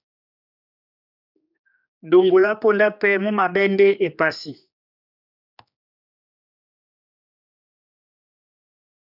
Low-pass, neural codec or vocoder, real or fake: 5.4 kHz; codec, 16 kHz, 2 kbps, X-Codec, HuBERT features, trained on general audio; fake